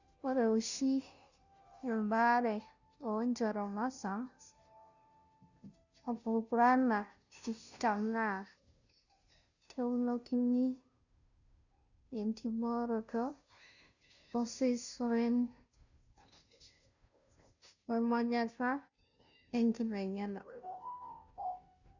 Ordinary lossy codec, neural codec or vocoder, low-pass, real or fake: none; codec, 16 kHz, 0.5 kbps, FunCodec, trained on Chinese and English, 25 frames a second; 7.2 kHz; fake